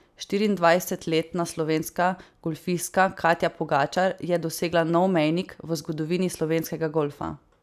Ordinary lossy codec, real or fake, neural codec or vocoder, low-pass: none; real; none; 14.4 kHz